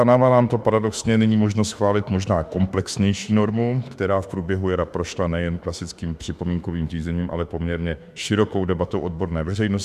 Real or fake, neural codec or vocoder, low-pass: fake; autoencoder, 48 kHz, 32 numbers a frame, DAC-VAE, trained on Japanese speech; 14.4 kHz